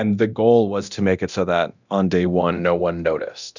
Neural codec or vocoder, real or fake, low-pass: codec, 24 kHz, 0.9 kbps, DualCodec; fake; 7.2 kHz